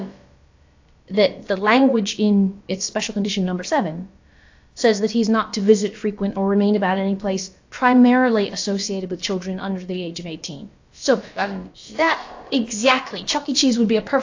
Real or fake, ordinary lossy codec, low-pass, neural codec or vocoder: fake; AAC, 48 kbps; 7.2 kHz; codec, 16 kHz, about 1 kbps, DyCAST, with the encoder's durations